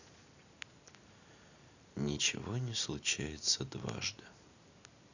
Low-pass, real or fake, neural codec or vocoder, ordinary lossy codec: 7.2 kHz; real; none; none